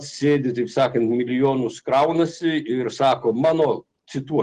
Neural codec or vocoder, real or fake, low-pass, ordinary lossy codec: none; real; 10.8 kHz; Opus, 16 kbps